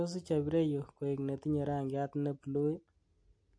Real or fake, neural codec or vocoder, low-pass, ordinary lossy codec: real; none; 9.9 kHz; MP3, 48 kbps